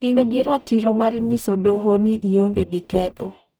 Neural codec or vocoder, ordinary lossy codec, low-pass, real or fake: codec, 44.1 kHz, 0.9 kbps, DAC; none; none; fake